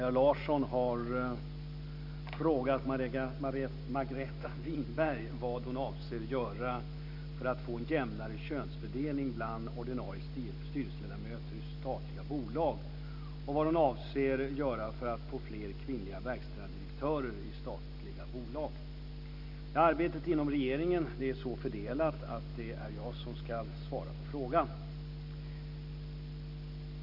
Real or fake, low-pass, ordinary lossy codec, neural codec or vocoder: real; 5.4 kHz; none; none